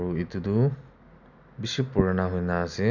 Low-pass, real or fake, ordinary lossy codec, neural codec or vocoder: 7.2 kHz; real; none; none